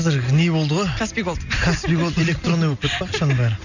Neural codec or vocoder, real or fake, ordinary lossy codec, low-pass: none; real; none; 7.2 kHz